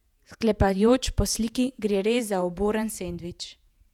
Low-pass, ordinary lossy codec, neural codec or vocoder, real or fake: 19.8 kHz; none; vocoder, 48 kHz, 128 mel bands, Vocos; fake